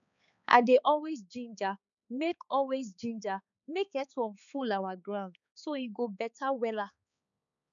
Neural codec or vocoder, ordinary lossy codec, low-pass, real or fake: codec, 16 kHz, 4 kbps, X-Codec, HuBERT features, trained on balanced general audio; none; 7.2 kHz; fake